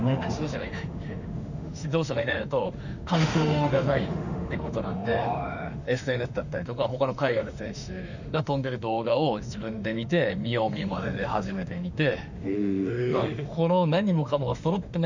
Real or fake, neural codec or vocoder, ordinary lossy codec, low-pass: fake; autoencoder, 48 kHz, 32 numbers a frame, DAC-VAE, trained on Japanese speech; none; 7.2 kHz